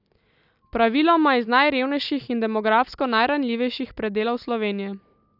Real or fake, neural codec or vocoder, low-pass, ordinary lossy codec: real; none; 5.4 kHz; none